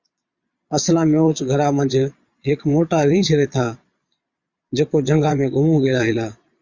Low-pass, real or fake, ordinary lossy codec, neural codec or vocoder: 7.2 kHz; fake; Opus, 64 kbps; vocoder, 22.05 kHz, 80 mel bands, Vocos